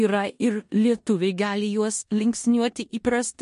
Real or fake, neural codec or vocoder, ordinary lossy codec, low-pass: fake; codec, 16 kHz in and 24 kHz out, 0.9 kbps, LongCat-Audio-Codec, four codebook decoder; MP3, 48 kbps; 10.8 kHz